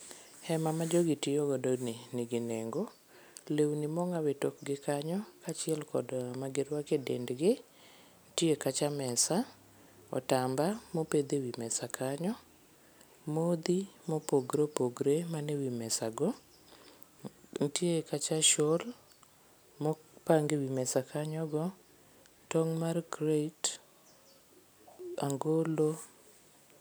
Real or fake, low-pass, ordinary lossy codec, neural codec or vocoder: real; none; none; none